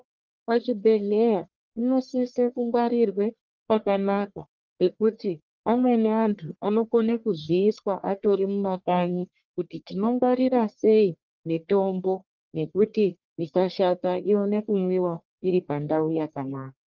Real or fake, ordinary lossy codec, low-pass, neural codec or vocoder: fake; Opus, 32 kbps; 7.2 kHz; codec, 44.1 kHz, 1.7 kbps, Pupu-Codec